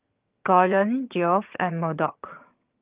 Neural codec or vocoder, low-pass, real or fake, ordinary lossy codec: vocoder, 22.05 kHz, 80 mel bands, HiFi-GAN; 3.6 kHz; fake; Opus, 24 kbps